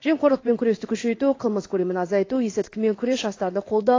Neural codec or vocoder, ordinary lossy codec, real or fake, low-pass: codec, 16 kHz in and 24 kHz out, 1 kbps, XY-Tokenizer; AAC, 32 kbps; fake; 7.2 kHz